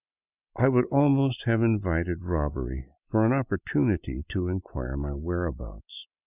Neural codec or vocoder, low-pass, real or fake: none; 3.6 kHz; real